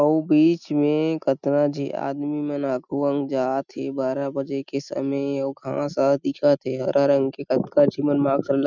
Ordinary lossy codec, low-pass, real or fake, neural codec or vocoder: none; 7.2 kHz; real; none